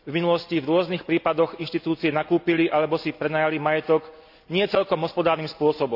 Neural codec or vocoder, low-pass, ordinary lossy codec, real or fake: none; 5.4 kHz; AAC, 48 kbps; real